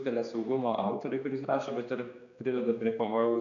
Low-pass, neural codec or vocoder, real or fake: 7.2 kHz; codec, 16 kHz, 2 kbps, X-Codec, HuBERT features, trained on general audio; fake